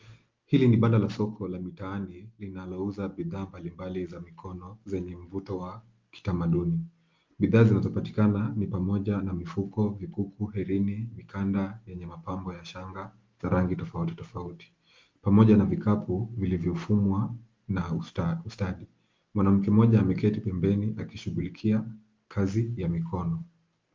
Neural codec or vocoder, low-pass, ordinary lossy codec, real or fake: none; 7.2 kHz; Opus, 32 kbps; real